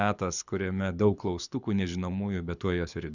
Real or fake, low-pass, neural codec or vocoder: fake; 7.2 kHz; codec, 24 kHz, 6 kbps, HILCodec